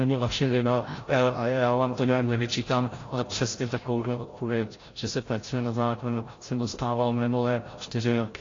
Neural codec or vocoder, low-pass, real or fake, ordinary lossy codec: codec, 16 kHz, 0.5 kbps, FreqCodec, larger model; 7.2 kHz; fake; AAC, 32 kbps